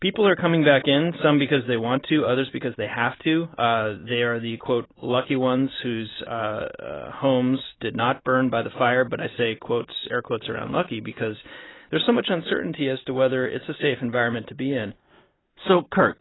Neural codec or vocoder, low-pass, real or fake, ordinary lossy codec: none; 7.2 kHz; real; AAC, 16 kbps